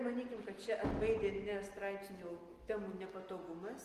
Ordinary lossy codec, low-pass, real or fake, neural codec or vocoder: Opus, 24 kbps; 14.4 kHz; real; none